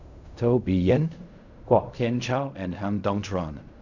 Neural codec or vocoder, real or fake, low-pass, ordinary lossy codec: codec, 16 kHz in and 24 kHz out, 0.4 kbps, LongCat-Audio-Codec, fine tuned four codebook decoder; fake; 7.2 kHz; none